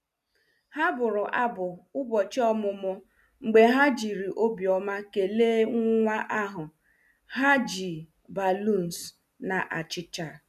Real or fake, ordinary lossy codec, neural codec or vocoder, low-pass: real; none; none; 14.4 kHz